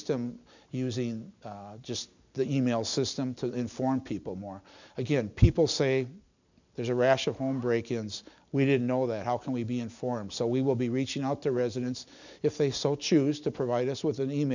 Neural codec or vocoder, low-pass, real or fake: none; 7.2 kHz; real